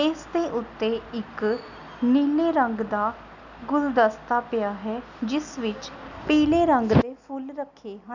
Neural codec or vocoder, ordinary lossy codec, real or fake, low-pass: none; none; real; 7.2 kHz